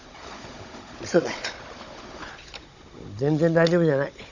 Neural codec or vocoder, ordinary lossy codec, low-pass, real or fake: codec, 16 kHz, 16 kbps, FunCodec, trained on Chinese and English, 50 frames a second; none; 7.2 kHz; fake